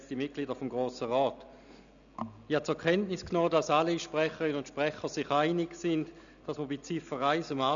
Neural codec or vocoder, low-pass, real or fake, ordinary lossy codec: none; 7.2 kHz; real; none